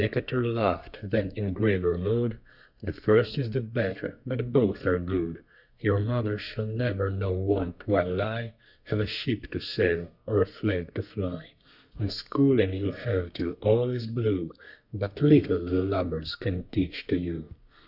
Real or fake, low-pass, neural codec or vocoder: fake; 5.4 kHz; codec, 32 kHz, 1.9 kbps, SNAC